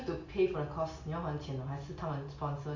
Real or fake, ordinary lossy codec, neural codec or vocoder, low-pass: real; Opus, 64 kbps; none; 7.2 kHz